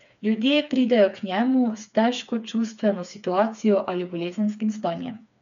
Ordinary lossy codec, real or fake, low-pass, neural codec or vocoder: none; fake; 7.2 kHz; codec, 16 kHz, 4 kbps, FreqCodec, smaller model